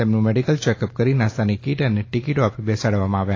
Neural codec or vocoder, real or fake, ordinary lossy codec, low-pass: none; real; AAC, 32 kbps; 7.2 kHz